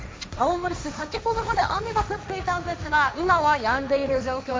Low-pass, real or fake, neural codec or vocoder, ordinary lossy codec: none; fake; codec, 16 kHz, 1.1 kbps, Voila-Tokenizer; none